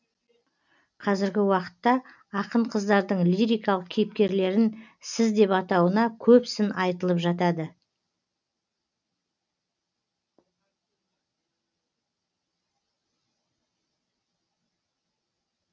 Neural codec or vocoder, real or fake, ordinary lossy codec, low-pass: none; real; none; 7.2 kHz